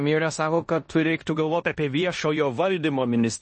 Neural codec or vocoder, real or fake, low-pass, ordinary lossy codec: codec, 16 kHz in and 24 kHz out, 0.9 kbps, LongCat-Audio-Codec, four codebook decoder; fake; 10.8 kHz; MP3, 32 kbps